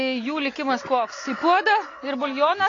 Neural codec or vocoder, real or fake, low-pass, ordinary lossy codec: none; real; 7.2 kHz; AAC, 32 kbps